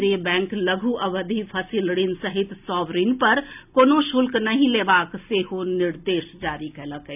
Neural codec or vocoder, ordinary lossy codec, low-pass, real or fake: none; none; 3.6 kHz; real